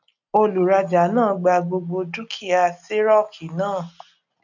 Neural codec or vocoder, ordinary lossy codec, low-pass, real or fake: none; none; 7.2 kHz; real